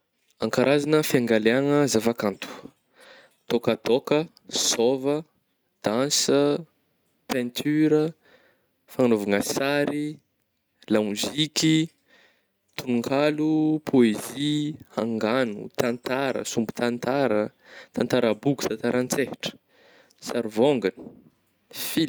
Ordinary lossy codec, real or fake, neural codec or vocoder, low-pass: none; real; none; none